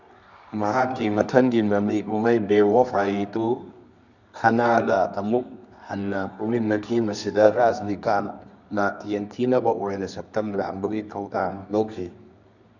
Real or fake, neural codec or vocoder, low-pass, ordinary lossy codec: fake; codec, 24 kHz, 0.9 kbps, WavTokenizer, medium music audio release; 7.2 kHz; none